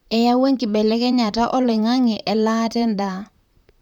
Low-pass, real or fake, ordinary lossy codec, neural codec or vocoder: 19.8 kHz; fake; none; vocoder, 44.1 kHz, 128 mel bands, Pupu-Vocoder